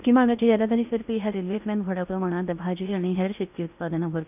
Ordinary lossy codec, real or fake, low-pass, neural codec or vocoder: none; fake; 3.6 kHz; codec, 16 kHz in and 24 kHz out, 0.8 kbps, FocalCodec, streaming, 65536 codes